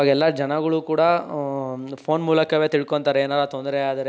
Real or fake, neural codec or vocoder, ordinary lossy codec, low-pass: real; none; none; none